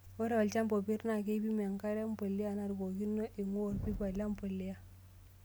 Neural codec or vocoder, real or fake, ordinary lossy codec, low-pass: none; real; none; none